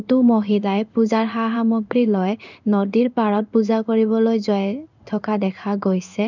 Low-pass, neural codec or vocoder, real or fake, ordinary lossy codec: 7.2 kHz; codec, 16 kHz in and 24 kHz out, 1 kbps, XY-Tokenizer; fake; none